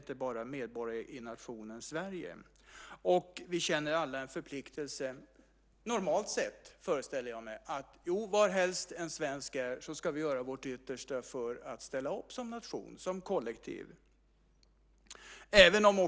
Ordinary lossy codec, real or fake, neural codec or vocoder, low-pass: none; real; none; none